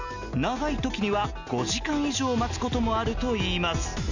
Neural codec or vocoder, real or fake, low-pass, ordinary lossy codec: none; real; 7.2 kHz; none